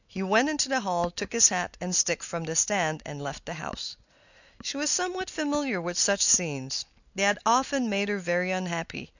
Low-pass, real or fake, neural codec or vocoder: 7.2 kHz; real; none